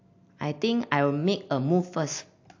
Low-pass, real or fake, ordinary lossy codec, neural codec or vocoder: 7.2 kHz; real; AAC, 48 kbps; none